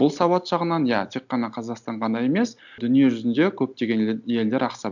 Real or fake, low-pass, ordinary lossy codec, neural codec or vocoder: real; 7.2 kHz; none; none